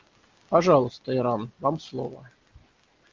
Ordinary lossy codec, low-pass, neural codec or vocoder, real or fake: Opus, 32 kbps; 7.2 kHz; none; real